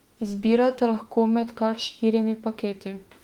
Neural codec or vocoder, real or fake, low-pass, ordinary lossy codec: autoencoder, 48 kHz, 32 numbers a frame, DAC-VAE, trained on Japanese speech; fake; 19.8 kHz; Opus, 24 kbps